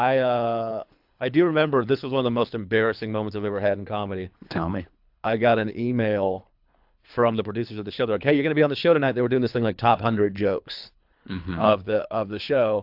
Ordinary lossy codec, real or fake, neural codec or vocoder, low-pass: AAC, 48 kbps; fake; codec, 24 kHz, 3 kbps, HILCodec; 5.4 kHz